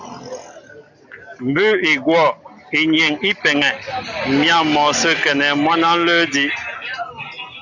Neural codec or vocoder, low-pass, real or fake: none; 7.2 kHz; real